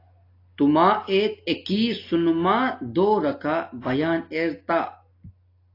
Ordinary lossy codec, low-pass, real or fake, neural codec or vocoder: AAC, 32 kbps; 5.4 kHz; real; none